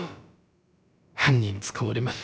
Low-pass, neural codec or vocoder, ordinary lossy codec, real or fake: none; codec, 16 kHz, about 1 kbps, DyCAST, with the encoder's durations; none; fake